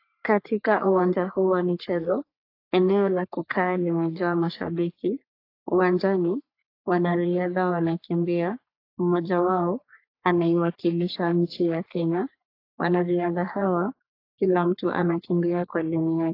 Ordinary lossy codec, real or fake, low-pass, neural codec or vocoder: AAC, 32 kbps; fake; 5.4 kHz; codec, 44.1 kHz, 3.4 kbps, Pupu-Codec